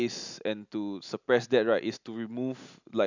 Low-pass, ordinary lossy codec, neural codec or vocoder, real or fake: 7.2 kHz; none; none; real